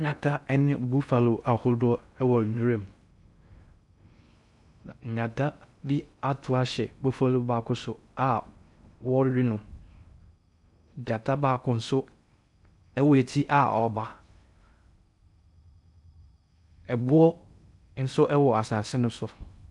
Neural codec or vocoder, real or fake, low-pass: codec, 16 kHz in and 24 kHz out, 0.6 kbps, FocalCodec, streaming, 2048 codes; fake; 10.8 kHz